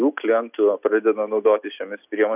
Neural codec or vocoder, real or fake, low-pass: none; real; 3.6 kHz